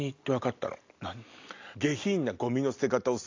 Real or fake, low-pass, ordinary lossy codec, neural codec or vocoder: real; 7.2 kHz; AAC, 48 kbps; none